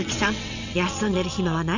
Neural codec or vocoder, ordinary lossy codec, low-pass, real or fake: vocoder, 22.05 kHz, 80 mel bands, WaveNeXt; none; 7.2 kHz; fake